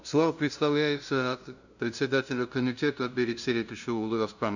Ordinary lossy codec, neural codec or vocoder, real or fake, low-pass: none; codec, 16 kHz, 0.5 kbps, FunCodec, trained on LibriTTS, 25 frames a second; fake; 7.2 kHz